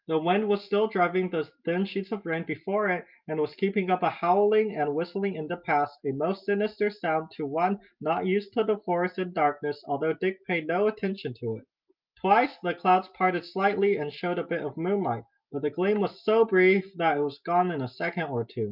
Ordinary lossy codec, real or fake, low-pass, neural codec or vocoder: Opus, 24 kbps; real; 5.4 kHz; none